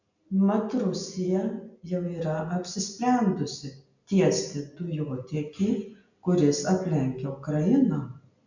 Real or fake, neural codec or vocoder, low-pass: real; none; 7.2 kHz